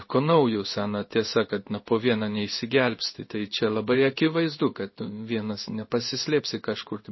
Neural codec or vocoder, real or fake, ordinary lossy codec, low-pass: codec, 16 kHz in and 24 kHz out, 1 kbps, XY-Tokenizer; fake; MP3, 24 kbps; 7.2 kHz